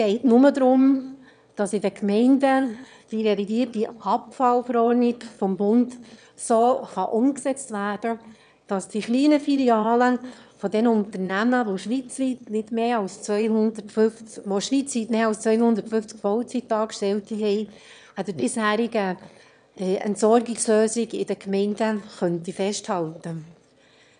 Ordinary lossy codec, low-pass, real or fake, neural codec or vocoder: none; 9.9 kHz; fake; autoencoder, 22.05 kHz, a latent of 192 numbers a frame, VITS, trained on one speaker